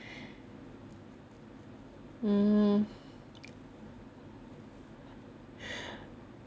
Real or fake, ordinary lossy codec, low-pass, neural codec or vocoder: real; none; none; none